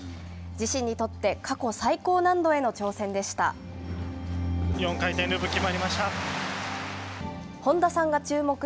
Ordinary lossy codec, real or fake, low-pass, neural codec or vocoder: none; real; none; none